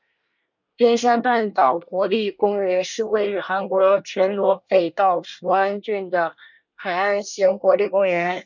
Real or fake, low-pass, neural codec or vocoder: fake; 7.2 kHz; codec, 24 kHz, 1 kbps, SNAC